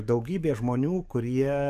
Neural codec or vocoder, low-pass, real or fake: codec, 44.1 kHz, 7.8 kbps, DAC; 14.4 kHz; fake